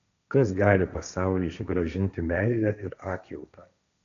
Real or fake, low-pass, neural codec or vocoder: fake; 7.2 kHz; codec, 16 kHz, 1.1 kbps, Voila-Tokenizer